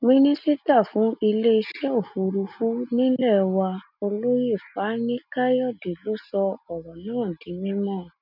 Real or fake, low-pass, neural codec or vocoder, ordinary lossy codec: real; 5.4 kHz; none; none